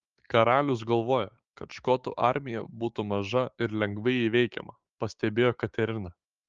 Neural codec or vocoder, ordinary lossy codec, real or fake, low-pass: codec, 16 kHz, 6 kbps, DAC; Opus, 24 kbps; fake; 7.2 kHz